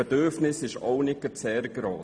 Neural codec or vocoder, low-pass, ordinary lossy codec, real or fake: none; 9.9 kHz; none; real